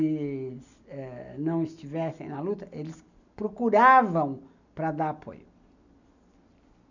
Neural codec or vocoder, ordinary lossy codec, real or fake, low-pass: none; none; real; 7.2 kHz